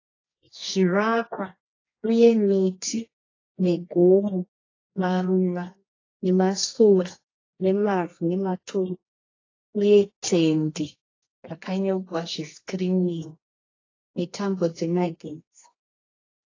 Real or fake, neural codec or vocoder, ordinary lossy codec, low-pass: fake; codec, 24 kHz, 0.9 kbps, WavTokenizer, medium music audio release; AAC, 32 kbps; 7.2 kHz